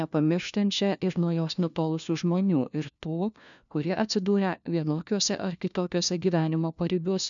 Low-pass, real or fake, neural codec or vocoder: 7.2 kHz; fake; codec, 16 kHz, 1 kbps, FunCodec, trained on LibriTTS, 50 frames a second